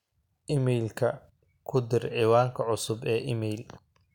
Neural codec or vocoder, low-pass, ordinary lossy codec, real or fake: none; 19.8 kHz; none; real